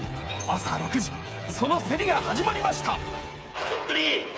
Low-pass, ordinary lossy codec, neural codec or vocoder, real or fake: none; none; codec, 16 kHz, 8 kbps, FreqCodec, smaller model; fake